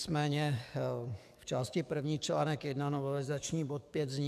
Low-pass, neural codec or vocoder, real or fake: 14.4 kHz; codec, 44.1 kHz, 7.8 kbps, DAC; fake